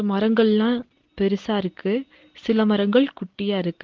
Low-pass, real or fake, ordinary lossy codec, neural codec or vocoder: 7.2 kHz; real; Opus, 16 kbps; none